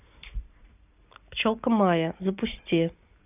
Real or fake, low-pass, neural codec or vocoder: real; 3.6 kHz; none